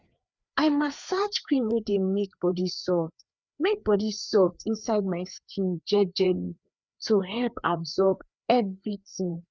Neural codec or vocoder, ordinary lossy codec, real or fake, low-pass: codec, 16 kHz, 4 kbps, FreqCodec, larger model; none; fake; none